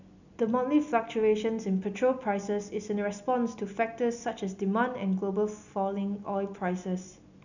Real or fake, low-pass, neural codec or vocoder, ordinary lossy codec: real; 7.2 kHz; none; none